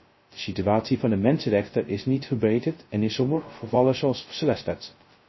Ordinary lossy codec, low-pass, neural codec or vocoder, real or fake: MP3, 24 kbps; 7.2 kHz; codec, 16 kHz, 0.2 kbps, FocalCodec; fake